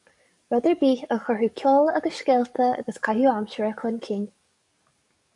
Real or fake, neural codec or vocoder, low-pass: fake; codec, 44.1 kHz, 7.8 kbps, DAC; 10.8 kHz